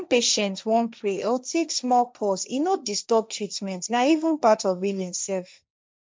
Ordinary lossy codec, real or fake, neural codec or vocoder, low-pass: none; fake; codec, 16 kHz, 1.1 kbps, Voila-Tokenizer; none